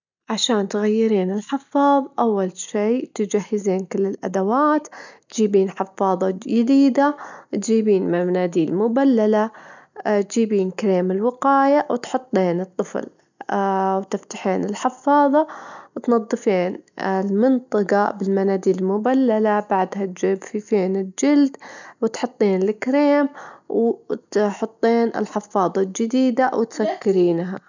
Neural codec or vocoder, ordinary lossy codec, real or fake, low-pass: none; none; real; 7.2 kHz